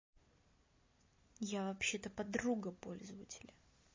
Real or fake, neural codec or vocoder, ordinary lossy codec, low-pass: real; none; MP3, 32 kbps; 7.2 kHz